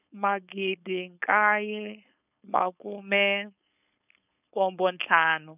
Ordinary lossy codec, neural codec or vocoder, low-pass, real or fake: none; codec, 16 kHz, 4.8 kbps, FACodec; 3.6 kHz; fake